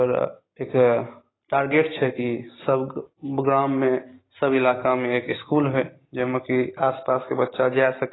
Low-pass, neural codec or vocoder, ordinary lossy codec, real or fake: 7.2 kHz; none; AAC, 16 kbps; real